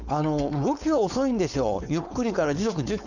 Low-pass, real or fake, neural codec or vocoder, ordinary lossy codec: 7.2 kHz; fake; codec, 16 kHz, 4.8 kbps, FACodec; none